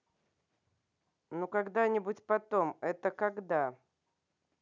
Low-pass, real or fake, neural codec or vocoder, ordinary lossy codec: 7.2 kHz; real; none; none